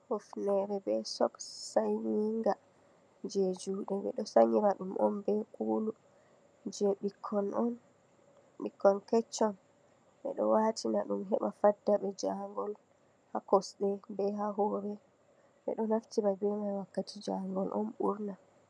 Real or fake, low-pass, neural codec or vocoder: real; 9.9 kHz; none